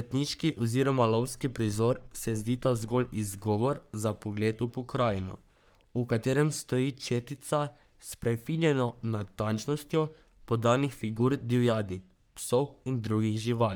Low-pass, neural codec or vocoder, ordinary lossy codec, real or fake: none; codec, 44.1 kHz, 3.4 kbps, Pupu-Codec; none; fake